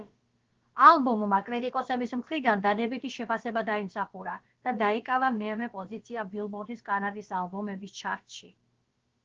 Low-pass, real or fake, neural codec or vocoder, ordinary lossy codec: 7.2 kHz; fake; codec, 16 kHz, about 1 kbps, DyCAST, with the encoder's durations; Opus, 16 kbps